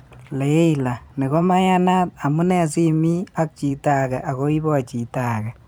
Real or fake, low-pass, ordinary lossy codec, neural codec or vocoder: fake; none; none; vocoder, 44.1 kHz, 128 mel bands every 512 samples, BigVGAN v2